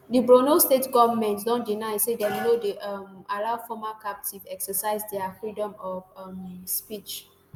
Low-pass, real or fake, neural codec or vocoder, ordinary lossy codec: none; real; none; none